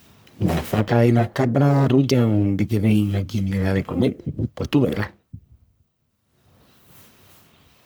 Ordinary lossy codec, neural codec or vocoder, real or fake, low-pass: none; codec, 44.1 kHz, 1.7 kbps, Pupu-Codec; fake; none